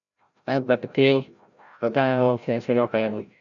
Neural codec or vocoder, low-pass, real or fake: codec, 16 kHz, 0.5 kbps, FreqCodec, larger model; 7.2 kHz; fake